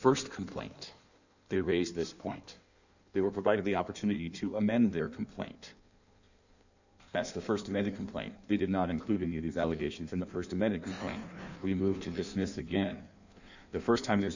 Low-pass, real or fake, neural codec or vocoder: 7.2 kHz; fake; codec, 16 kHz in and 24 kHz out, 1.1 kbps, FireRedTTS-2 codec